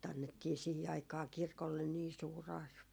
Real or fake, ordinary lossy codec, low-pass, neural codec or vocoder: real; none; none; none